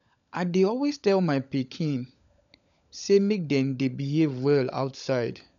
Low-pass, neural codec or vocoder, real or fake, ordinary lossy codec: 7.2 kHz; codec, 16 kHz, 16 kbps, FunCodec, trained on LibriTTS, 50 frames a second; fake; none